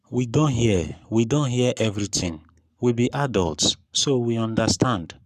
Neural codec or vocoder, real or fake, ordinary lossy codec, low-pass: codec, 44.1 kHz, 7.8 kbps, Pupu-Codec; fake; none; 14.4 kHz